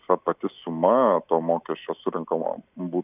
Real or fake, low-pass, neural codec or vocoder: real; 3.6 kHz; none